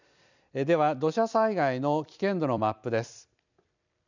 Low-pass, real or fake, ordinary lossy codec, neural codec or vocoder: 7.2 kHz; fake; none; vocoder, 44.1 kHz, 128 mel bands every 256 samples, BigVGAN v2